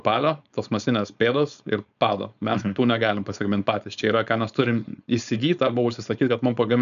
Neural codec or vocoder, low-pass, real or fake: codec, 16 kHz, 4.8 kbps, FACodec; 7.2 kHz; fake